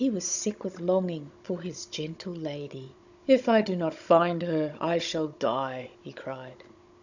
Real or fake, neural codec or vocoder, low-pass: fake; codec, 16 kHz, 16 kbps, FunCodec, trained on Chinese and English, 50 frames a second; 7.2 kHz